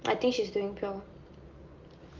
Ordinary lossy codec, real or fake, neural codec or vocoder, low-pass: Opus, 24 kbps; real; none; 7.2 kHz